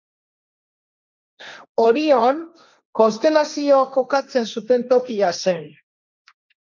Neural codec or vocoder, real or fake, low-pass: codec, 16 kHz, 1.1 kbps, Voila-Tokenizer; fake; 7.2 kHz